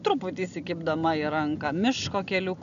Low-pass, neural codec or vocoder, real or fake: 7.2 kHz; none; real